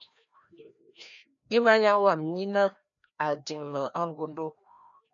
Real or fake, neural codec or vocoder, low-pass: fake; codec, 16 kHz, 1 kbps, FreqCodec, larger model; 7.2 kHz